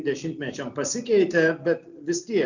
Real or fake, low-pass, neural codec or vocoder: real; 7.2 kHz; none